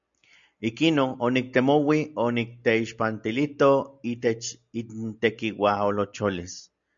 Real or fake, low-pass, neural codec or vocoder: real; 7.2 kHz; none